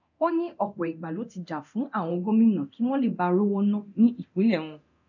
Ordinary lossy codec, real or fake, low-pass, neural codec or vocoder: none; fake; 7.2 kHz; codec, 24 kHz, 0.9 kbps, DualCodec